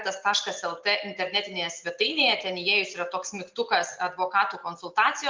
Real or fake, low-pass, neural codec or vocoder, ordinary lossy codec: real; 7.2 kHz; none; Opus, 32 kbps